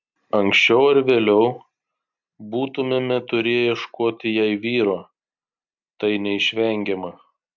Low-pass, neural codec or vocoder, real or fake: 7.2 kHz; none; real